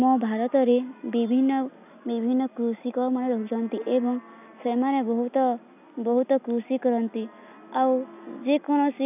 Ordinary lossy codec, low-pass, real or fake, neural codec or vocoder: none; 3.6 kHz; real; none